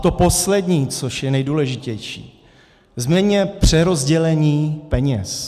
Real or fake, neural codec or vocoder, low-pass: real; none; 14.4 kHz